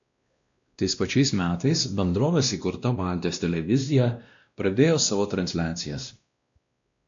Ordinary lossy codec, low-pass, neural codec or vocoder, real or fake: MP3, 64 kbps; 7.2 kHz; codec, 16 kHz, 1 kbps, X-Codec, WavLM features, trained on Multilingual LibriSpeech; fake